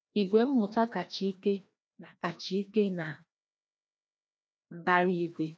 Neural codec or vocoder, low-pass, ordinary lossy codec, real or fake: codec, 16 kHz, 1 kbps, FreqCodec, larger model; none; none; fake